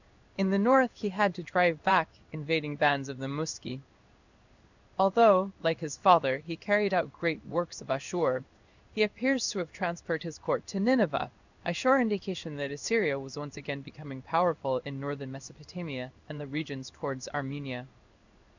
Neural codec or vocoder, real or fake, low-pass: codec, 16 kHz in and 24 kHz out, 1 kbps, XY-Tokenizer; fake; 7.2 kHz